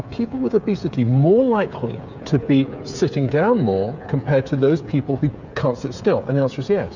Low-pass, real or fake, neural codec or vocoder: 7.2 kHz; fake; codec, 16 kHz, 8 kbps, FreqCodec, smaller model